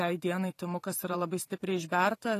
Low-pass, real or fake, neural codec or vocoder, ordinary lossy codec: 14.4 kHz; fake; vocoder, 44.1 kHz, 128 mel bands every 512 samples, BigVGAN v2; AAC, 48 kbps